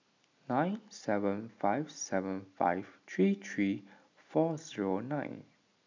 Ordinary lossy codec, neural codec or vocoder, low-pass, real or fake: MP3, 64 kbps; none; 7.2 kHz; real